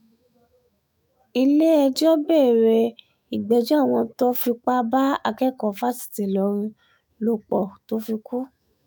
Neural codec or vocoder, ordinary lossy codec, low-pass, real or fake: autoencoder, 48 kHz, 128 numbers a frame, DAC-VAE, trained on Japanese speech; none; none; fake